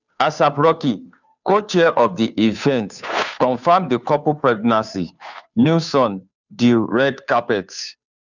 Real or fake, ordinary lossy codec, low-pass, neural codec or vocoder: fake; none; 7.2 kHz; codec, 16 kHz, 2 kbps, FunCodec, trained on Chinese and English, 25 frames a second